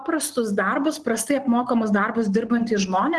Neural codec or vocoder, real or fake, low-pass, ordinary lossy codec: none; real; 10.8 kHz; Opus, 16 kbps